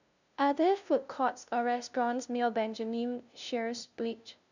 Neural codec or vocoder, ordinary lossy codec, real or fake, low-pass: codec, 16 kHz, 0.5 kbps, FunCodec, trained on LibriTTS, 25 frames a second; none; fake; 7.2 kHz